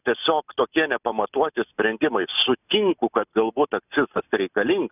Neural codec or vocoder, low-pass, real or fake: none; 3.6 kHz; real